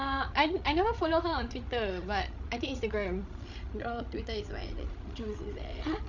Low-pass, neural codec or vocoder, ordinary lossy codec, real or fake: 7.2 kHz; codec, 16 kHz, 16 kbps, FreqCodec, larger model; none; fake